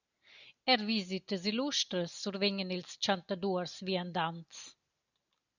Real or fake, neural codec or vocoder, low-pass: real; none; 7.2 kHz